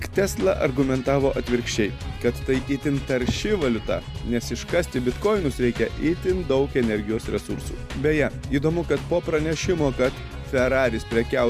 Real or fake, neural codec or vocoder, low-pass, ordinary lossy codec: real; none; 14.4 kHz; MP3, 96 kbps